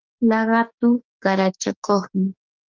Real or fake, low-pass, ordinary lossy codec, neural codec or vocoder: fake; 7.2 kHz; Opus, 24 kbps; codec, 16 kHz, 6 kbps, DAC